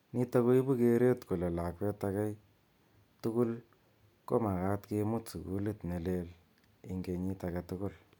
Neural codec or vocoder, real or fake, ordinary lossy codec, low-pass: none; real; none; 19.8 kHz